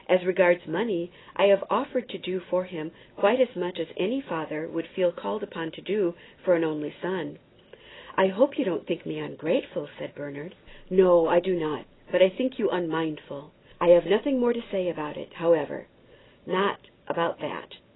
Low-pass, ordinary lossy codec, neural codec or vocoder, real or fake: 7.2 kHz; AAC, 16 kbps; none; real